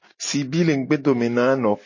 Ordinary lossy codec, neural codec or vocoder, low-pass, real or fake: MP3, 32 kbps; none; 7.2 kHz; real